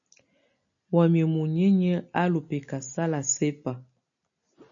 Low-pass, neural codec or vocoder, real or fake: 7.2 kHz; none; real